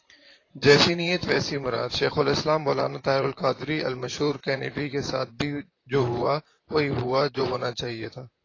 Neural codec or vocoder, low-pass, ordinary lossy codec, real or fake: vocoder, 44.1 kHz, 128 mel bands, Pupu-Vocoder; 7.2 kHz; AAC, 32 kbps; fake